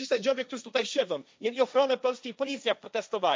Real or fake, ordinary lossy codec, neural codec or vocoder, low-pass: fake; none; codec, 16 kHz, 1.1 kbps, Voila-Tokenizer; none